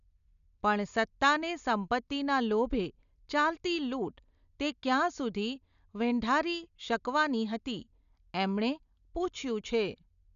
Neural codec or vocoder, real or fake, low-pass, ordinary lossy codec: none; real; 7.2 kHz; none